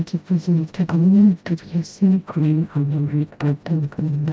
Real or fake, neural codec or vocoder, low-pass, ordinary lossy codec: fake; codec, 16 kHz, 0.5 kbps, FreqCodec, smaller model; none; none